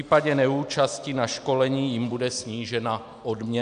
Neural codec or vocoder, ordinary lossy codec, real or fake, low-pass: none; AAC, 96 kbps; real; 9.9 kHz